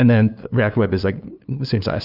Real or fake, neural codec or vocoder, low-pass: fake; codec, 16 kHz, 2 kbps, FunCodec, trained on LibriTTS, 25 frames a second; 5.4 kHz